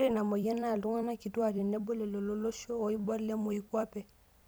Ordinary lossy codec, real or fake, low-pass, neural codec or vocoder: none; fake; none; vocoder, 44.1 kHz, 128 mel bands every 256 samples, BigVGAN v2